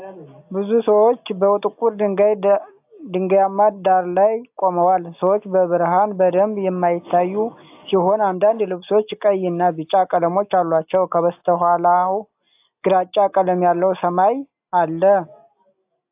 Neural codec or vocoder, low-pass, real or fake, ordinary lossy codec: none; 3.6 kHz; real; AAC, 32 kbps